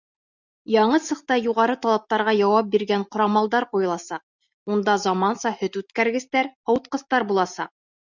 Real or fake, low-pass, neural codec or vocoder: real; 7.2 kHz; none